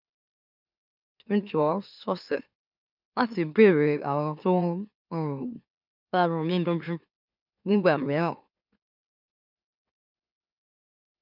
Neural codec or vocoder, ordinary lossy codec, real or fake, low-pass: autoencoder, 44.1 kHz, a latent of 192 numbers a frame, MeloTTS; none; fake; 5.4 kHz